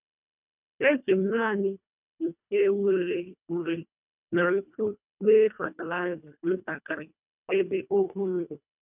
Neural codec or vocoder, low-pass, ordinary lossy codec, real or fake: codec, 24 kHz, 1.5 kbps, HILCodec; 3.6 kHz; none; fake